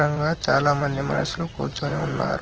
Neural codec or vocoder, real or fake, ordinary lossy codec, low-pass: vocoder, 44.1 kHz, 128 mel bands, Pupu-Vocoder; fake; Opus, 16 kbps; 7.2 kHz